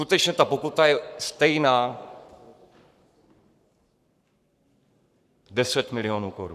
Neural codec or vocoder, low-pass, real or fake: codec, 44.1 kHz, 7.8 kbps, Pupu-Codec; 14.4 kHz; fake